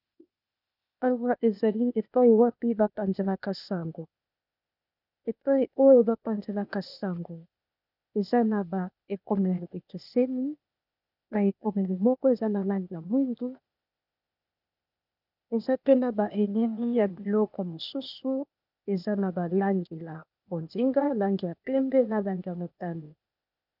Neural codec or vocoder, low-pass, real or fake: codec, 16 kHz, 0.8 kbps, ZipCodec; 5.4 kHz; fake